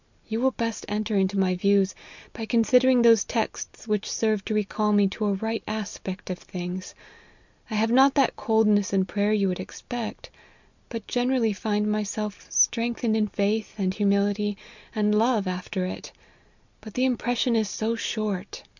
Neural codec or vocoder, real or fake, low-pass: none; real; 7.2 kHz